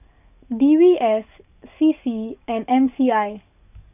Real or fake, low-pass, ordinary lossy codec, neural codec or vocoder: fake; 3.6 kHz; none; codec, 44.1 kHz, 7.8 kbps, DAC